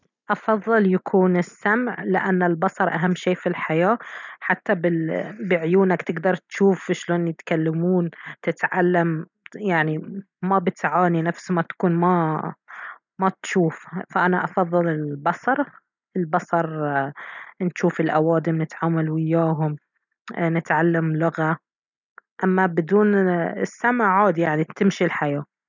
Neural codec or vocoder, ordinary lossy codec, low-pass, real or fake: none; none; 7.2 kHz; real